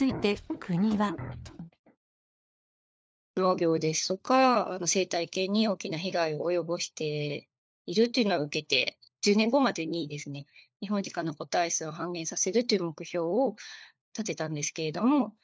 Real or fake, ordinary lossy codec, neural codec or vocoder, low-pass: fake; none; codec, 16 kHz, 4 kbps, FunCodec, trained on LibriTTS, 50 frames a second; none